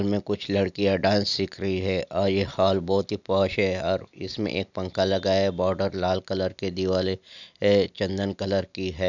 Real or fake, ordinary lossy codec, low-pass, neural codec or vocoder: real; none; 7.2 kHz; none